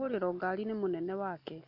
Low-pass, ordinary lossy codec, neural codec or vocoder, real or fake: 5.4 kHz; none; none; real